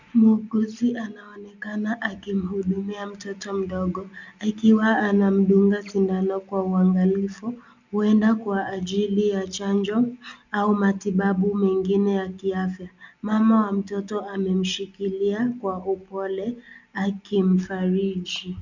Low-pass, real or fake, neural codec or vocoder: 7.2 kHz; real; none